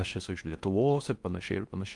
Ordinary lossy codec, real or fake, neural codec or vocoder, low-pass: Opus, 32 kbps; fake; codec, 16 kHz in and 24 kHz out, 0.6 kbps, FocalCodec, streaming, 4096 codes; 10.8 kHz